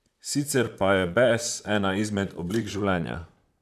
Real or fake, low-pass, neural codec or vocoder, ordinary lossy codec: fake; 14.4 kHz; vocoder, 44.1 kHz, 128 mel bands, Pupu-Vocoder; none